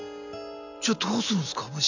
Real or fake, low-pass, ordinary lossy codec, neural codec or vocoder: real; 7.2 kHz; none; none